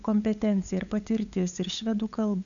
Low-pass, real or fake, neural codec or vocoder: 7.2 kHz; fake; codec, 16 kHz, 8 kbps, FunCodec, trained on LibriTTS, 25 frames a second